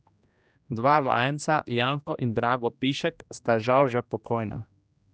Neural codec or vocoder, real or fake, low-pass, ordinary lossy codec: codec, 16 kHz, 1 kbps, X-Codec, HuBERT features, trained on general audio; fake; none; none